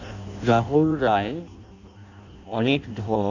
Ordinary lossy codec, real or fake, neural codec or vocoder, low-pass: none; fake; codec, 16 kHz in and 24 kHz out, 0.6 kbps, FireRedTTS-2 codec; 7.2 kHz